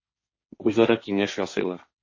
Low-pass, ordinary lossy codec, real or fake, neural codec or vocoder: 7.2 kHz; MP3, 32 kbps; fake; codec, 16 kHz, 1.1 kbps, Voila-Tokenizer